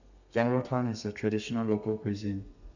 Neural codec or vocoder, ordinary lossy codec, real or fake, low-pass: codec, 32 kHz, 1.9 kbps, SNAC; none; fake; 7.2 kHz